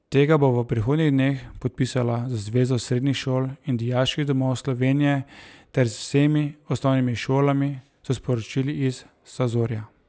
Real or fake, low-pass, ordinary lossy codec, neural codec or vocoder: real; none; none; none